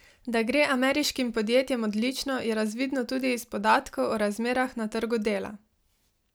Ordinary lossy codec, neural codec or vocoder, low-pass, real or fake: none; none; none; real